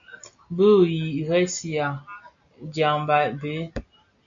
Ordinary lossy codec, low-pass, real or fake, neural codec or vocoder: MP3, 48 kbps; 7.2 kHz; real; none